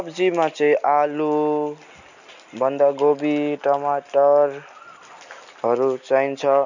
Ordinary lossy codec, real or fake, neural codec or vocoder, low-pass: none; real; none; 7.2 kHz